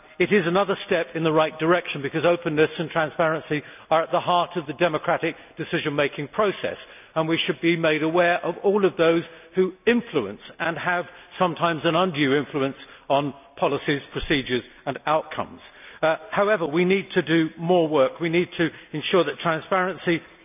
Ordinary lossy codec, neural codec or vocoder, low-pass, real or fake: none; none; 3.6 kHz; real